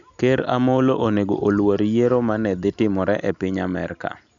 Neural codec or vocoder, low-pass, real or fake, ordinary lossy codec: none; 7.2 kHz; real; none